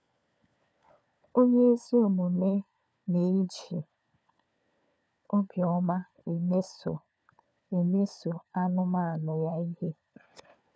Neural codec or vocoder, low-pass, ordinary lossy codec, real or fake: codec, 16 kHz, 4 kbps, FunCodec, trained on LibriTTS, 50 frames a second; none; none; fake